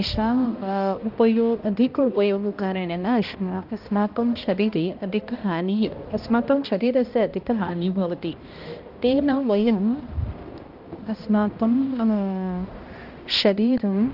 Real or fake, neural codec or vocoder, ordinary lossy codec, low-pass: fake; codec, 16 kHz, 1 kbps, X-Codec, HuBERT features, trained on balanced general audio; Opus, 24 kbps; 5.4 kHz